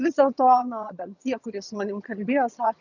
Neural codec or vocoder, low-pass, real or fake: vocoder, 22.05 kHz, 80 mel bands, HiFi-GAN; 7.2 kHz; fake